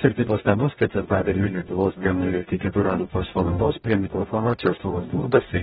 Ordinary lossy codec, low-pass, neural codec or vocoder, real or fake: AAC, 16 kbps; 19.8 kHz; codec, 44.1 kHz, 0.9 kbps, DAC; fake